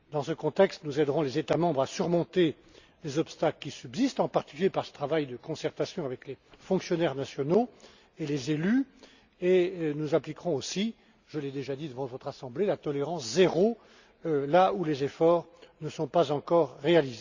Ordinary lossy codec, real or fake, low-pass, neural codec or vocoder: Opus, 64 kbps; real; 7.2 kHz; none